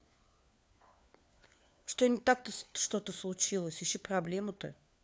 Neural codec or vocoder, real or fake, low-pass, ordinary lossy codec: codec, 16 kHz, 4 kbps, FunCodec, trained on LibriTTS, 50 frames a second; fake; none; none